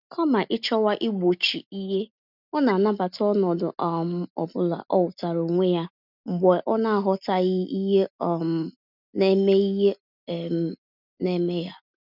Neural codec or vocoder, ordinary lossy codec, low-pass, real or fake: none; none; 5.4 kHz; real